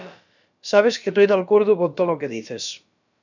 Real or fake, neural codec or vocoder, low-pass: fake; codec, 16 kHz, about 1 kbps, DyCAST, with the encoder's durations; 7.2 kHz